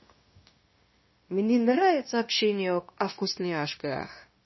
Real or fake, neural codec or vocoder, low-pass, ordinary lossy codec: fake; codec, 16 kHz, 0.9 kbps, LongCat-Audio-Codec; 7.2 kHz; MP3, 24 kbps